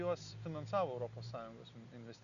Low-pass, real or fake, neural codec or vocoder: 7.2 kHz; real; none